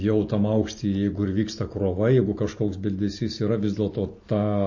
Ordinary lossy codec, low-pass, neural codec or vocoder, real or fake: MP3, 32 kbps; 7.2 kHz; none; real